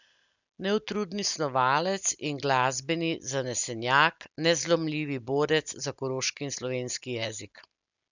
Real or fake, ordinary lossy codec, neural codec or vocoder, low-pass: real; none; none; 7.2 kHz